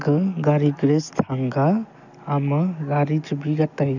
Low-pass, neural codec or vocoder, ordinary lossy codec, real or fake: 7.2 kHz; none; none; real